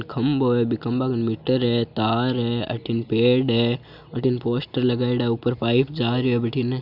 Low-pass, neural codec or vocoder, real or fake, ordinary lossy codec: 5.4 kHz; none; real; none